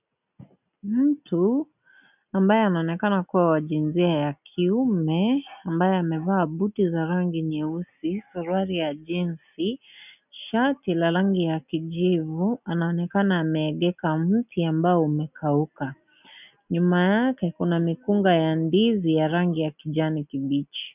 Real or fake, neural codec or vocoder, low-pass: real; none; 3.6 kHz